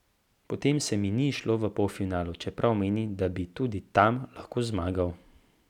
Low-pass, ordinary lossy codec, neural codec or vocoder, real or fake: 19.8 kHz; none; none; real